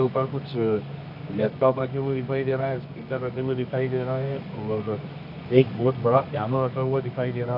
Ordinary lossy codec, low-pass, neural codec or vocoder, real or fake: none; 5.4 kHz; codec, 24 kHz, 0.9 kbps, WavTokenizer, medium music audio release; fake